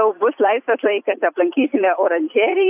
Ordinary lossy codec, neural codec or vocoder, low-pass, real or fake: AAC, 32 kbps; vocoder, 44.1 kHz, 128 mel bands every 256 samples, BigVGAN v2; 3.6 kHz; fake